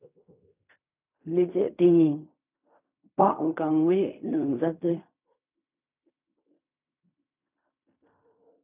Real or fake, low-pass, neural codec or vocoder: fake; 3.6 kHz; codec, 16 kHz in and 24 kHz out, 0.4 kbps, LongCat-Audio-Codec, fine tuned four codebook decoder